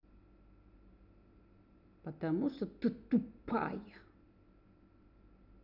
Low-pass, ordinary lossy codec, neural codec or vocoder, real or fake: 5.4 kHz; none; none; real